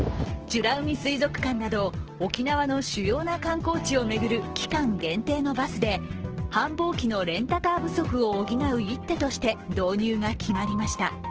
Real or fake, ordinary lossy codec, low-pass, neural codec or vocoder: fake; Opus, 16 kbps; 7.2 kHz; codec, 16 kHz, 6 kbps, DAC